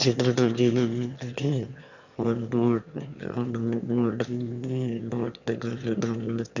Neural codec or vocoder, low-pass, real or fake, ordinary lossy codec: autoencoder, 22.05 kHz, a latent of 192 numbers a frame, VITS, trained on one speaker; 7.2 kHz; fake; none